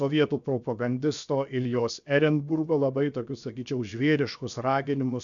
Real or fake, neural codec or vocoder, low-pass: fake; codec, 16 kHz, 0.7 kbps, FocalCodec; 7.2 kHz